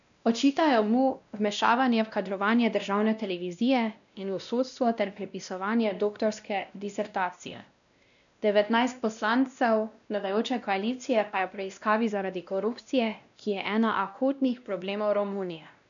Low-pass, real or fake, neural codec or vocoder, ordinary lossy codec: 7.2 kHz; fake; codec, 16 kHz, 1 kbps, X-Codec, WavLM features, trained on Multilingual LibriSpeech; none